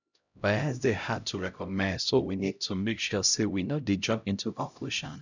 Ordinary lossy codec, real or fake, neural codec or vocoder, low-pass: none; fake; codec, 16 kHz, 0.5 kbps, X-Codec, HuBERT features, trained on LibriSpeech; 7.2 kHz